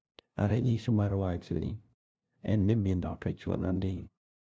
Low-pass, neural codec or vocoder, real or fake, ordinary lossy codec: none; codec, 16 kHz, 0.5 kbps, FunCodec, trained on LibriTTS, 25 frames a second; fake; none